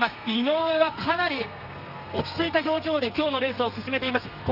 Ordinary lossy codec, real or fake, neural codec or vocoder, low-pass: none; fake; codec, 32 kHz, 1.9 kbps, SNAC; 5.4 kHz